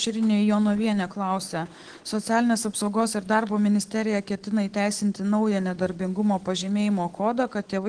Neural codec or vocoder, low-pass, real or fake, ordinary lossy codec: autoencoder, 48 kHz, 128 numbers a frame, DAC-VAE, trained on Japanese speech; 9.9 kHz; fake; Opus, 16 kbps